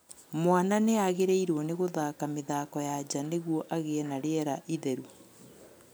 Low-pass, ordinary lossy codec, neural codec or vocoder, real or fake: none; none; none; real